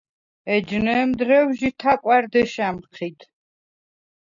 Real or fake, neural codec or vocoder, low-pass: real; none; 7.2 kHz